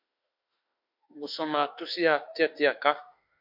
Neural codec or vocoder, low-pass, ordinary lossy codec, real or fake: autoencoder, 48 kHz, 32 numbers a frame, DAC-VAE, trained on Japanese speech; 5.4 kHz; MP3, 48 kbps; fake